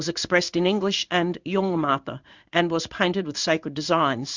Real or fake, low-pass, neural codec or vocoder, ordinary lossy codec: fake; 7.2 kHz; codec, 16 kHz in and 24 kHz out, 1 kbps, XY-Tokenizer; Opus, 64 kbps